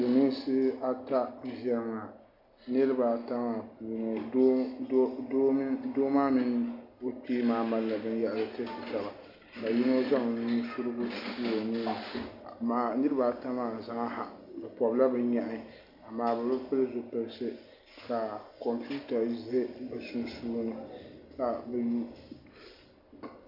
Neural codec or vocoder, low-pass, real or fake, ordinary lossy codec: none; 5.4 kHz; real; MP3, 48 kbps